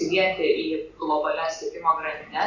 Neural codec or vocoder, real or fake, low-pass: none; real; 7.2 kHz